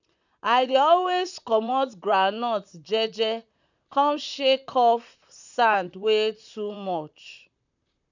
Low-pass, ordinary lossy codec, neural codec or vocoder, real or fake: 7.2 kHz; none; vocoder, 44.1 kHz, 128 mel bands, Pupu-Vocoder; fake